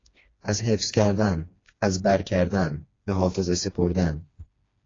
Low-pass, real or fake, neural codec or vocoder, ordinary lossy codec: 7.2 kHz; fake; codec, 16 kHz, 2 kbps, FreqCodec, smaller model; AAC, 32 kbps